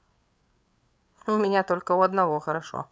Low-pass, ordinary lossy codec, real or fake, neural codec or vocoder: none; none; fake; codec, 16 kHz, 8 kbps, FreqCodec, larger model